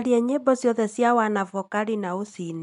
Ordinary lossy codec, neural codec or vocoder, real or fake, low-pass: none; none; real; 10.8 kHz